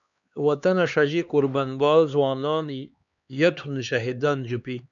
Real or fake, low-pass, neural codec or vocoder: fake; 7.2 kHz; codec, 16 kHz, 2 kbps, X-Codec, HuBERT features, trained on LibriSpeech